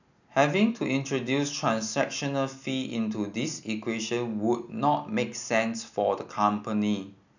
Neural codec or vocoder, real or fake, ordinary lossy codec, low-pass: none; real; none; 7.2 kHz